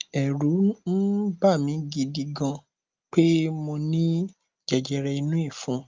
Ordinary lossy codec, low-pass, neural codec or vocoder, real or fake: Opus, 24 kbps; 7.2 kHz; none; real